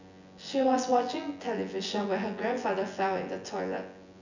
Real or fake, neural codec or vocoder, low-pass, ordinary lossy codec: fake; vocoder, 24 kHz, 100 mel bands, Vocos; 7.2 kHz; none